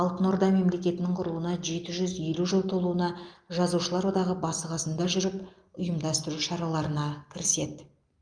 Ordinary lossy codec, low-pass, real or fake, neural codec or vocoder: Opus, 32 kbps; 9.9 kHz; real; none